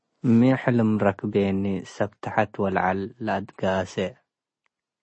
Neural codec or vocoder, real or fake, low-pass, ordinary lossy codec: none; real; 9.9 kHz; MP3, 32 kbps